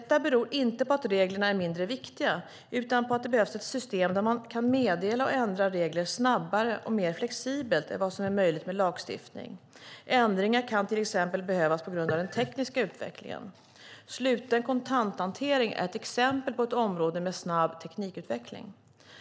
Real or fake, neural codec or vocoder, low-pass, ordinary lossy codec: real; none; none; none